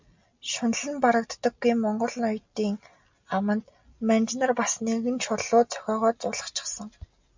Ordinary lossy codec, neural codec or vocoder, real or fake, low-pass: MP3, 64 kbps; none; real; 7.2 kHz